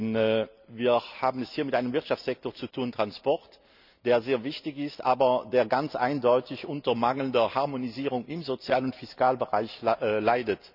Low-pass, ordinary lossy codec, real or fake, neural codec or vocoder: 5.4 kHz; none; real; none